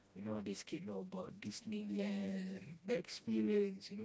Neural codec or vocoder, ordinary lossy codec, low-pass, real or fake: codec, 16 kHz, 1 kbps, FreqCodec, smaller model; none; none; fake